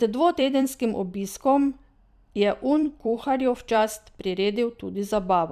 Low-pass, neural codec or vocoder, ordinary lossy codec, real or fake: 14.4 kHz; vocoder, 44.1 kHz, 128 mel bands every 256 samples, BigVGAN v2; none; fake